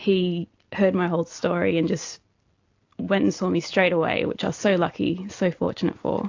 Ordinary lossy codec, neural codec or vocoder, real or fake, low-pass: AAC, 48 kbps; none; real; 7.2 kHz